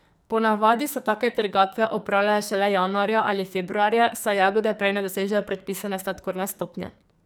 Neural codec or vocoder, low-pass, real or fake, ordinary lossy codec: codec, 44.1 kHz, 2.6 kbps, SNAC; none; fake; none